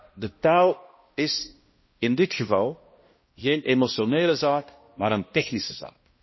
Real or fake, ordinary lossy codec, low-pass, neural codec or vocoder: fake; MP3, 24 kbps; 7.2 kHz; codec, 16 kHz, 1 kbps, X-Codec, HuBERT features, trained on balanced general audio